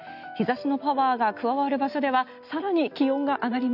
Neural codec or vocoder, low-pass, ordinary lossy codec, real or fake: none; 5.4 kHz; none; real